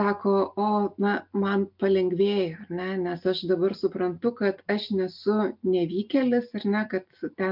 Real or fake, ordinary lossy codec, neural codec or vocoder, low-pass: real; MP3, 48 kbps; none; 5.4 kHz